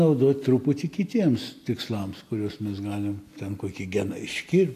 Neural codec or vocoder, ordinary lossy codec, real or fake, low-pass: none; AAC, 64 kbps; real; 14.4 kHz